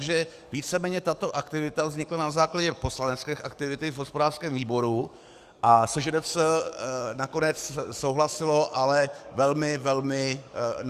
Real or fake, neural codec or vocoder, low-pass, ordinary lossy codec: fake; codec, 44.1 kHz, 7.8 kbps, DAC; 14.4 kHz; Opus, 64 kbps